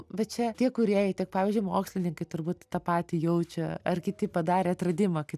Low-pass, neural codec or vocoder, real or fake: 14.4 kHz; none; real